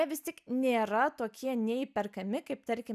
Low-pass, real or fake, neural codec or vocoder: 14.4 kHz; real; none